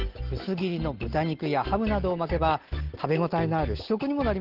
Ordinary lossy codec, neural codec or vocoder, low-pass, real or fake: Opus, 16 kbps; none; 5.4 kHz; real